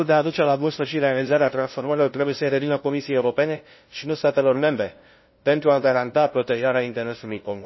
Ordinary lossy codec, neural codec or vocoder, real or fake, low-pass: MP3, 24 kbps; codec, 16 kHz, 0.5 kbps, FunCodec, trained on LibriTTS, 25 frames a second; fake; 7.2 kHz